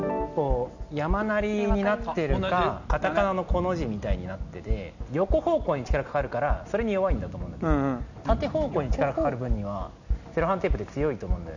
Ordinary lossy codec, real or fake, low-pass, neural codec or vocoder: none; real; 7.2 kHz; none